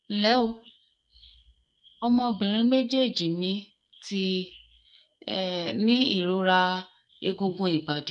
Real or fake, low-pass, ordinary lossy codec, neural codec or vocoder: fake; 10.8 kHz; none; codec, 44.1 kHz, 2.6 kbps, SNAC